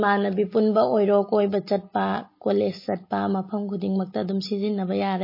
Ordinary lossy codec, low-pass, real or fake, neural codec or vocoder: MP3, 24 kbps; 5.4 kHz; real; none